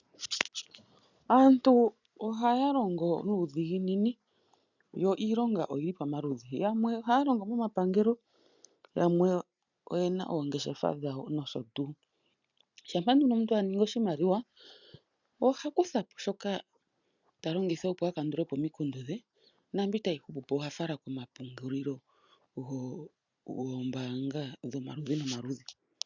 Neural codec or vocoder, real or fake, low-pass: none; real; 7.2 kHz